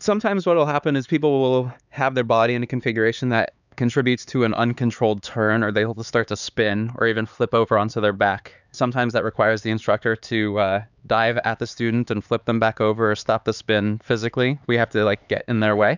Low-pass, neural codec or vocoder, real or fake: 7.2 kHz; codec, 16 kHz, 4 kbps, X-Codec, HuBERT features, trained on LibriSpeech; fake